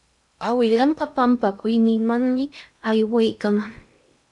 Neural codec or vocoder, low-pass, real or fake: codec, 16 kHz in and 24 kHz out, 0.6 kbps, FocalCodec, streaming, 2048 codes; 10.8 kHz; fake